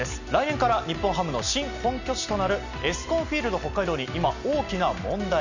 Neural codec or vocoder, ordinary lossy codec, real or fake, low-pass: none; none; real; 7.2 kHz